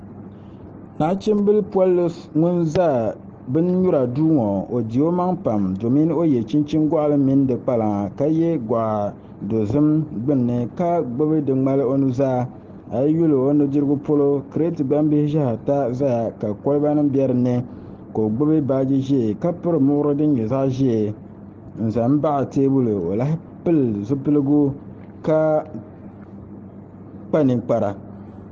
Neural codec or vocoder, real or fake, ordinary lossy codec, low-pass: none; real; Opus, 16 kbps; 7.2 kHz